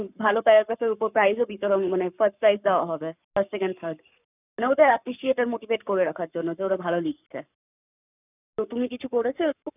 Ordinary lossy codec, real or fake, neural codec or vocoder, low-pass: none; fake; codec, 44.1 kHz, 7.8 kbps, Pupu-Codec; 3.6 kHz